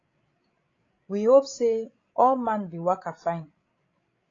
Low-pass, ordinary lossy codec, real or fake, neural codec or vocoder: 7.2 kHz; AAC, 32 kbps; fake; codec, 16 kHz, 16 kbps, FreqCodec, larger model